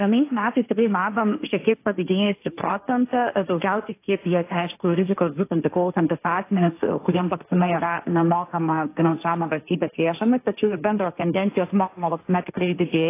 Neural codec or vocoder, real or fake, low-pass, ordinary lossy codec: codec, 16 kHz, 1.1 kbps, Voila-Tokenizer; fake; 3.6 kHz; AAC, 24 kbps